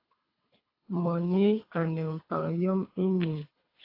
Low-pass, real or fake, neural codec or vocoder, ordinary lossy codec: 5.4 kHz; fake; codec, 24 kHz, 3 kbps, HILCodec; AAC, 32 kbps